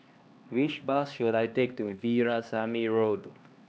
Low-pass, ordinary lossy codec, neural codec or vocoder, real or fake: none; none; codec, 16 kHz, 2 kbps, X-Codec, HuBERT features, trained on LibriSpeech; fake